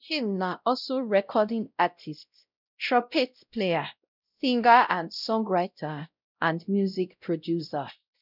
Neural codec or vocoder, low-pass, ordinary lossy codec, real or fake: codec, 16 kHz, 0.5 kbps, X-Codec, WavLM features, trained on Multilingual LibriSpeech; 5.4 kHz; none; fake